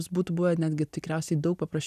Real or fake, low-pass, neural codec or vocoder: real; 14.4 kHz; none